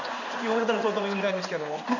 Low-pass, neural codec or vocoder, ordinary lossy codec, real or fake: 7.2 kHz; codec, 16 kHz in and 24 kHz out, 1 kbps, XY-Tokenizer; none; fake